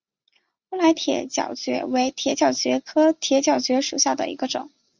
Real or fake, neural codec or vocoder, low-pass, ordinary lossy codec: real; none; 7.2 kHz; Opus, 64 kbps